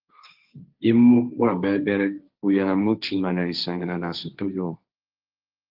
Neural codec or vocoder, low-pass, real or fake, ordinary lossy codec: codec, 16 kHz, 1.1 kbps, Voila-Tokenizer; 5.4 kHz; fake; Opus, 32 kbps